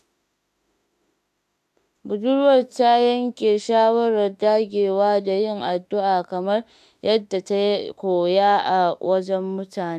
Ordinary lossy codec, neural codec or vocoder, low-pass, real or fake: AAC, 96 kbps; autoencoder, 48 kHz, 32 numbers a frame, DAC-VAE, trained on Japanese speech; 14.4 kHz; fake